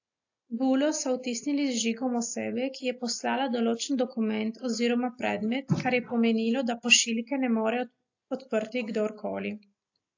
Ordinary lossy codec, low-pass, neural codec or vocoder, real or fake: AAC, 48 kbps; 7.2 kHz; none; real